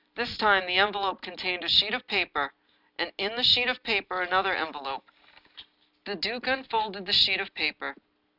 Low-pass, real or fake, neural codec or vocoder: 5.4 kHz; real; none